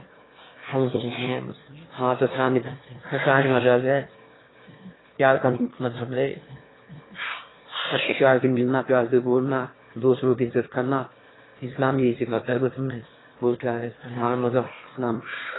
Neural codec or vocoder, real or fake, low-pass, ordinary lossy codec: autoencoder, 22.05 kHz, a latent of 192 numbers a frame, VITS, trained on one speaker; fake; 7.2 kHz; AAC, 16 kbps